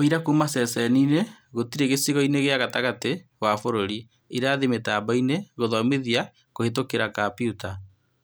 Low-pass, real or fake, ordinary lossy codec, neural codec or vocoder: none; real; none; none